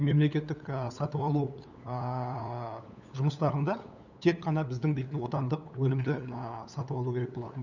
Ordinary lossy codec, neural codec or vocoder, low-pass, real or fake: none; codec, 16 kHz, 8 kbps, FunCodec, trained on LibriTTS, 25 frames a second; 7.2 kHz; fake